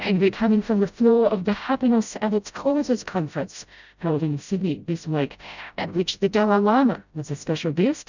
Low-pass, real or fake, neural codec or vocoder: 7.2 kHz; fake; codec, 16 kHz, 0.5 kbps, FreqCodec, smaller model